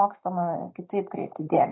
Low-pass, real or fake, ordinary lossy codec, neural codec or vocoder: 7.2 kHz; real; AAC, 16 kbps; none